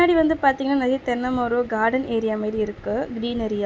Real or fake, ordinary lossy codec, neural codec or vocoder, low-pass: real; none; none; none